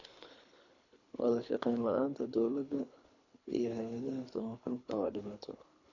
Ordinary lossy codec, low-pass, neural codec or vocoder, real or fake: none; 7.2 kHz; codec, 24 kHz, 3 kbps, HILCodec; fake